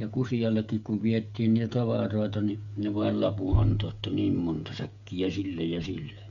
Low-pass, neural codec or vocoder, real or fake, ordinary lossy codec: 7.2 kHz; codec, 16 kHz, 16 kbps, FreqCodec, smaller model; fake; Opus, 64 kbps